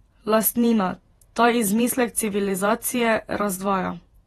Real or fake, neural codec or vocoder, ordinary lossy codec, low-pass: fake; vocoder, 48 kHz, 128 mel bands, Vocos; AAC, 32 kbps; 19.8 kHz